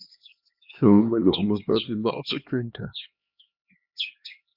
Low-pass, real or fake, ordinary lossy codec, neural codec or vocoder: 5.4 kHz; fake; Opus, 64 kbps; codec, 16 kHz, 2 kbps, X-Codec, HuBERT features, trained on LibriSpeech